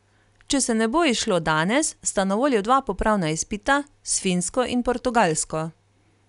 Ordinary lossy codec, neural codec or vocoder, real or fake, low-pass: none; none; real; 10.8 kHz